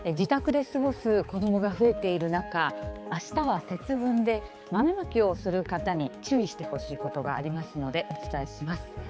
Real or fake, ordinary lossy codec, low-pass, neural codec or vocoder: fake; none; none; codec, 16 kHz, 4 kbps, X-Codec, HuBERT features, trained on balanced general audio